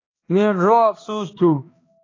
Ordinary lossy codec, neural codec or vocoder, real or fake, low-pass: AAC, 32 kbps; codec, 16 kHz, 1 kbps, X-Codec, HuBERT features, trained on balanced general audio; fake; 7.2 kHz